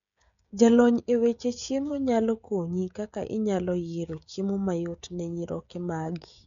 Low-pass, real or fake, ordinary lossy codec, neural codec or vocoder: 7.2 kHz; fake; MP3, 96 kbps; codec, 16 kHz, 16 kbps, FreqCodec, smaller model